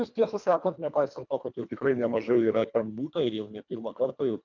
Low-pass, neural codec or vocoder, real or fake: 7.2 kHz; codec, 24 kHz, 1.5 kbps, HILCodec; fake